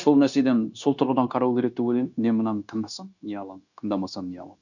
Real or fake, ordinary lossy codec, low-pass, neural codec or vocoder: fake; none; 7.2 kHz; codec, 16 kHz, 0.9 kbps, LongCat-Audio-Codec